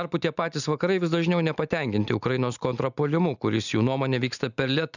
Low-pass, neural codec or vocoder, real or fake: 7.2 kHz; none; real